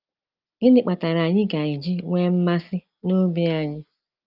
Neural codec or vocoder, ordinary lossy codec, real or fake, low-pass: none; Opus, 24 kbps; real; 5.4 kHz